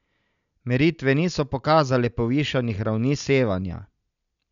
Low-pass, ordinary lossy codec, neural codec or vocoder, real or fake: 7.2 kHz; none; none; real